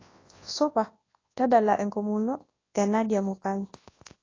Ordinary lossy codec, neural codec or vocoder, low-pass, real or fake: AAC, 32 kbps; codec, 24 kHz, 0.9 kbps, WavTokenizer, large speech release; 7.2 kHz; fake